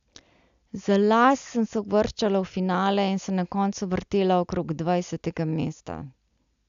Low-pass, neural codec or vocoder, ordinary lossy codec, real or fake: 7.2 kHz; none; AAC, 96 kbps; real